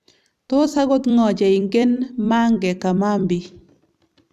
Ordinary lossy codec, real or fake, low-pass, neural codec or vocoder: none; fake; 14.4 kHz; vocoder, 44.1 kHz, 128 mel bands every 256 samples, BigVGAN v2